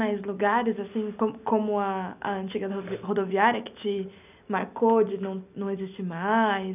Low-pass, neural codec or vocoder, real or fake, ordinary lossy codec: 3.6 kHz; none; real; none